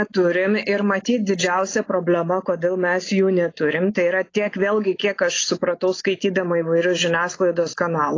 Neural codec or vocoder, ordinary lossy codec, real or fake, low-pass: none; AAC, 32 kbps; real; 7.2 kHz